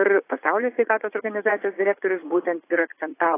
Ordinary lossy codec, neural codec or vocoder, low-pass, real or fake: AAC, 16 kbps; vocoder, 44.1 kHz, 80 mel bands, Vocos; 3.6 kHz; fake